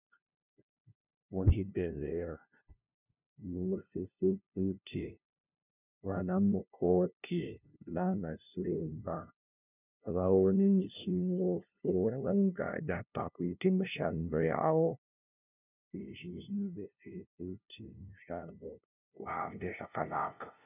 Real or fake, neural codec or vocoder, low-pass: fake; codec, 16 kHz, 0.5 kbps, FunCodec, trained on LibriTTS, 25 frames a second; 3.6 kHz